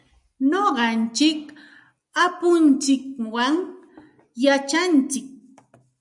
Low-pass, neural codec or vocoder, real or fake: 10.8 kHz; none; real